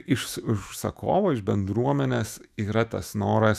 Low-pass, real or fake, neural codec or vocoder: 14.4 kHz; fake; autoencoder, 48 kHz, 128 numbers a frame, DAC-VAE, trained on Japanese speech